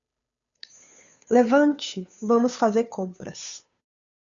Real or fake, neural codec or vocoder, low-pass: fake; codec, 16 kHz, 2 kbps, FunCodec, trained on Chinese and English, 25 frames a second; 7.2 kHz